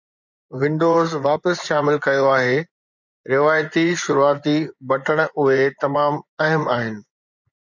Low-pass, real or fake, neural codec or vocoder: 7.2 kHz; fake; vocoder, 24 kHz, 100 mel bands, Vocos